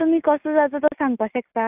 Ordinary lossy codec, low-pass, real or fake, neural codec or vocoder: AAC, 32 kbps; 3.6 kHz; real; none